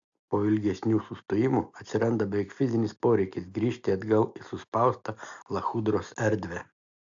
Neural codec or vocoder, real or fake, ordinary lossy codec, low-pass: none; real; AAC, 64 kbps; 7.2 kHz